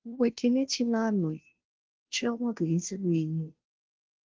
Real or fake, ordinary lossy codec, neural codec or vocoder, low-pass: fake; Opus, 16 kbps; codec, 16 kHz, 0.5 kbps, FunCodec, trained on Chinese and English, 25 frames a second; 7.2 kHz